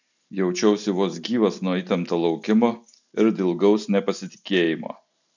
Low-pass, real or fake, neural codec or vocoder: 7.2 kHz; real; none